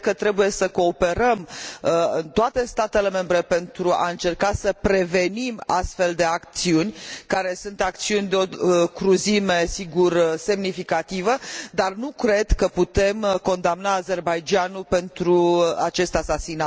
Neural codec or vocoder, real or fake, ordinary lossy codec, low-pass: none; real; none; none